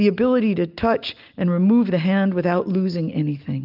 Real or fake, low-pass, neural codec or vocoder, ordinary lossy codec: real; 5.4 kHz; none; Opus, 24 kbps